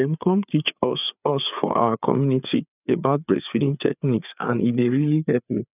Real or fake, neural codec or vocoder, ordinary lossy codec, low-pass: fake; codec, 16 kHz, 4 kbps, FreqCodec, larger model; none; 3.6 kHz